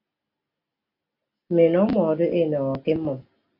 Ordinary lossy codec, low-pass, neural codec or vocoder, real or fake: MP3, 32 kbps; 5.4 kHz; none; real